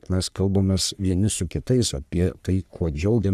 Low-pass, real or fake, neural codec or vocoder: 14.4 kHz; fake; codec, 44.1 kHz, 3.4 kbps, Pupu-Codec